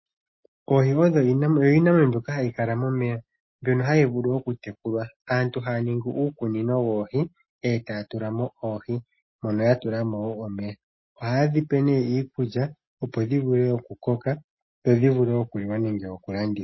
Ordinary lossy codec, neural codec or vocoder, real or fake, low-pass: MP3, 24 kbps; none; real; 7.2 kHz